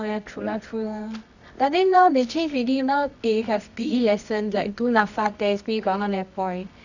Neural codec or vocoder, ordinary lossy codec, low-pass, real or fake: codec, 24 kHz, 0.9 kbps, WavTokenizer, medium music audio release; none; 7.2 kHz; fake